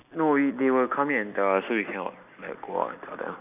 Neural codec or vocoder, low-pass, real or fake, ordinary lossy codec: none; 3.6 kHz; real; none